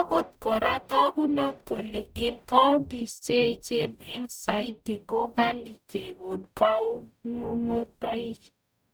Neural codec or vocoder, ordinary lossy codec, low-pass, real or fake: codec, 44.1 kHz, 0.9 kbps, DAC; none; none; fake